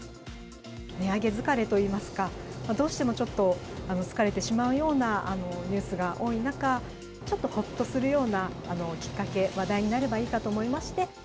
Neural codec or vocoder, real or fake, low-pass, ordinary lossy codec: none; real; none; none